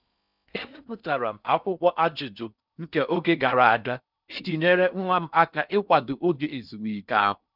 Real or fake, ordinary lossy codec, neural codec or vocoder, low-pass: fake; none; codec, 16 kHz in and 24 kHz out, 0.6 kbps, FocalCodec, streaming, 4096 codes; 5.4 kHz